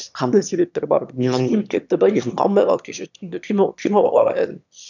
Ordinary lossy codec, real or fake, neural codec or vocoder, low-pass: none; fake; autoencoder, 22.05 kHz, a latent of 192 numbers a frame, VITS, trained on one speaker; 7.2 kHz